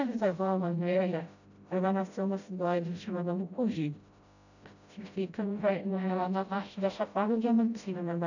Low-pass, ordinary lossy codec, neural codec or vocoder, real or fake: 7.2 kHz; none; codec, 16 kHz, 0.5 kbps, FreqCodec, smaller model; fake